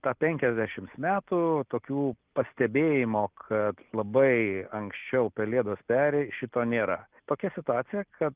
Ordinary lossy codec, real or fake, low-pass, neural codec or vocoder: Opus, 24 kbps; real; 3.6 kHz; none